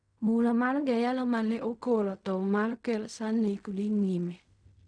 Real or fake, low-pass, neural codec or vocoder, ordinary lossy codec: fake; 9.9 kHz; codec, 16 kHz in and 24 kHz out, 0.4 kbps, LongCat-Audio-Codec, fine tuned four codebook decoder; none